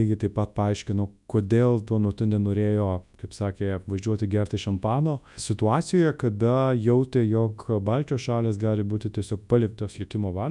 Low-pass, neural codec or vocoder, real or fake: 10.8 kHz; codec, 24 kHz, 0.9 kbps, WavTokenizer, large speech release; fake